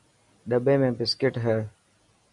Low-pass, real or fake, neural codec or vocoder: 10.8 kHz; real; none